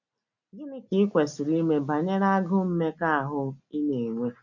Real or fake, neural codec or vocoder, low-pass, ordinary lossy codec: real; none; 7.2 kHz; none